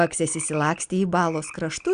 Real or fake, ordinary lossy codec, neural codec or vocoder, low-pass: real; Opus, 64 kbps; none; 9.9 kHz